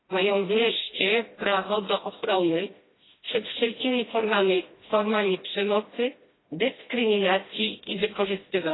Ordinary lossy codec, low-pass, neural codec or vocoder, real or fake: AAC, 16 kbps; 7.2 kHz; codec, 16 kHz, 1 kbps, FreqCodec, smaller model; fake